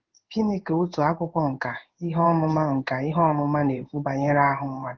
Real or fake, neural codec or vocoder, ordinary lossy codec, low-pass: fake; codec, 16 kHz in and 24 kHz out, 1 kbps, XY-Tokenizer; Opus, 16 kbps; 7.2 kHz